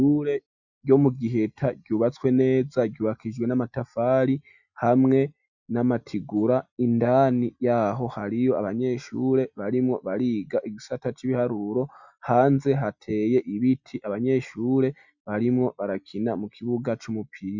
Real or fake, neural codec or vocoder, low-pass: real; none; 7.2 kHz